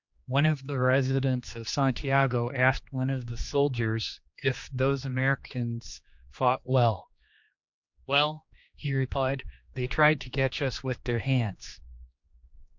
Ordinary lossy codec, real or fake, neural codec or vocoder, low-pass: MP3, 64 kbps; fake; codec, 16 kHz, 2 kbps, X-Codec, HuBERT features, trained on general audio; 7.2 kHz